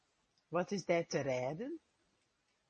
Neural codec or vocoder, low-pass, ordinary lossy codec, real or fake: none; 10.8 kHz; MP3, 32 kbps; real